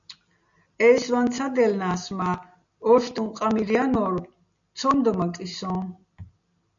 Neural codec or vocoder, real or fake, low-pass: none; real; 7.2 kHz